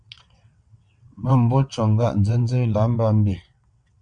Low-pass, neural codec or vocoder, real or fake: 9.9 kHz; vocoder, 22.05 kHz, 80 mel bands, WaveNeXt; fake